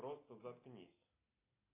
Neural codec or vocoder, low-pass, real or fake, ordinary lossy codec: none; 3.6 kHz; real; AAC, 24 kbps